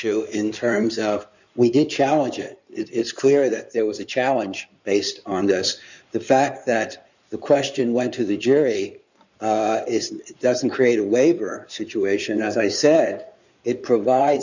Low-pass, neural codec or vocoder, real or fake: 7.2 kHz; codec, 16 kHz in and 24 kHz out, 2.2 kbps, FireRedTTS-2 codec; fake